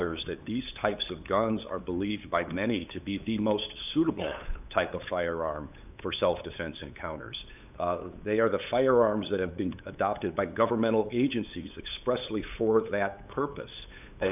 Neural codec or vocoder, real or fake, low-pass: codec, 16 kHz, 8 kbps, FunCodec, trained on LibriTTS, 25 frames a second; fake; 3.6 kHz